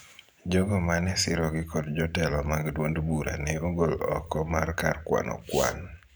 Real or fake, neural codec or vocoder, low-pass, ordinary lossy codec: fake; vocoder, 44.1 kHz, 128 mel bands every 512 samples, BigVGAN v2; none; none